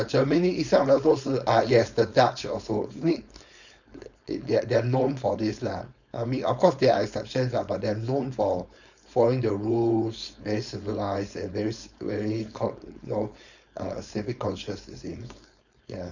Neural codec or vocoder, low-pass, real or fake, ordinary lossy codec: codec, 16 kHz, 4.8 kbps, FACodec; 7.2 kHz; fake; none